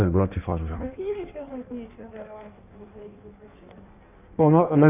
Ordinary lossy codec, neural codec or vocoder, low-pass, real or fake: none; codec, 16 kHz in and 24 kHz out, 1.1 kbps, FireRedTTS-2 codec; 3.6 kHz; fake